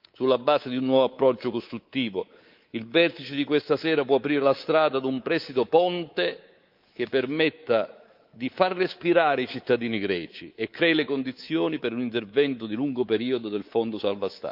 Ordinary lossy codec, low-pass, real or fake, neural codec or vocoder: Opus, 24 kbps; 5.4 kHz; fake; codec, 24 kHz, 3.1 kbps, DualCodec